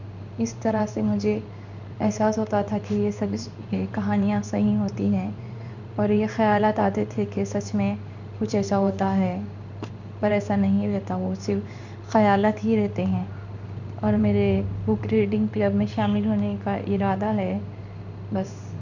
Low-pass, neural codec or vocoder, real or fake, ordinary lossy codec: 7.2 kHz; codec, 16 kHz in and 24 kHz out, 1 kbps, XY-Tokenizer; fake; none